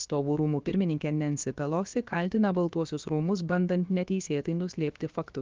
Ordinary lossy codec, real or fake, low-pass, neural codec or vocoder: Opus, 24 kbps; fake; 7.2 kHz; codec, 16 kHz, about 1 kbps, DyCAST, with the encoder's durations